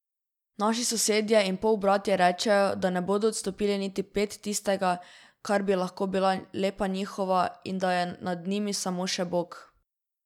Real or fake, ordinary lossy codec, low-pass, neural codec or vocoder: real; none; 19.8 kHz; none